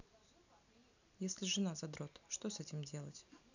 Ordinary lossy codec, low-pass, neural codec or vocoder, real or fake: none; 7.2 kHz; none; real